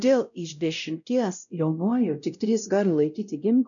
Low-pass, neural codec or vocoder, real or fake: 7.2 kHz; codec, 16 kHz, 0.5 kbps, X-Codec, WavLM features, trained on Multilingual LibriSpeech; fake